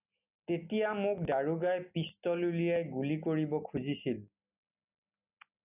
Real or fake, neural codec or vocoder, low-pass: real; none; 3.6 kHz